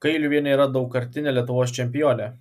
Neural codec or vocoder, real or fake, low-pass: none; real; 14.4 kHz